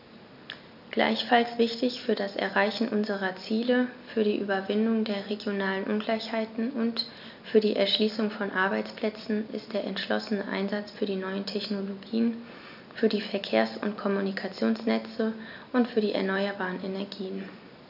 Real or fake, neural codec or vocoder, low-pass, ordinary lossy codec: real; none; 5.4 kHz; none